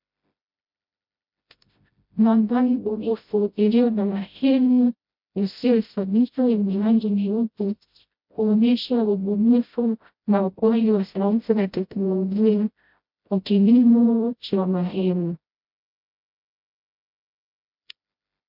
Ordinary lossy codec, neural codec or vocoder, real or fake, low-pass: MP3, 48 kbps; codec, 16 kHz, 0.5 kbps, FreqCodec, smaller model; fake; 5.4 kHz